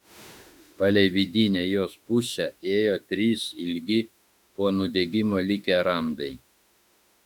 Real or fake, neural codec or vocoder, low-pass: fake; autoencoder, 48 kHz, 32 numbers a frame, DAC-VAE, trained on Japanese speech; 19.8 kHz